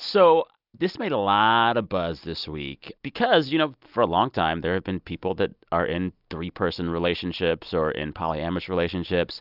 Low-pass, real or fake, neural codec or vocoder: 5.4 kHz; real; none